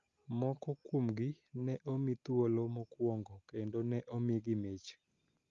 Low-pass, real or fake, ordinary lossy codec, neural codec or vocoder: 7.2 kHz; real; Opus, 32 kbps; none